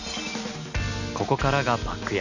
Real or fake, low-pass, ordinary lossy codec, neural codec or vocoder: real; 7.2 kHz; none; none